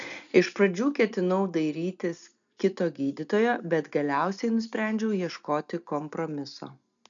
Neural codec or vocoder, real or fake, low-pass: none; real; 7.2 kHz